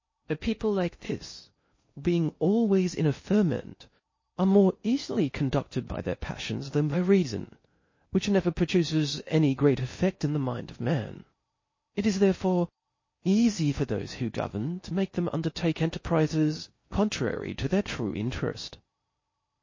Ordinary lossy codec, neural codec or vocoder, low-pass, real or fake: MP3, 32 kbps; codec, 16 kHz in and 24 kHz out, 0.6 kbps, FocalCodec, streaming, 2048 codes; 7.2 kHz; fake